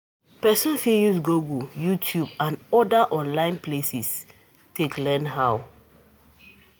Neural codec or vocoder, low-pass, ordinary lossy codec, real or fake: none; none; none; real